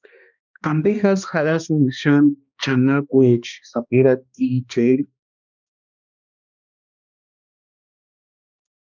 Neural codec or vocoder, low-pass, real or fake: codec, 16 kHz, 1 kbps, X-Codec, HuBERT features, trained on balanced general audio; 7.2 kHz; fake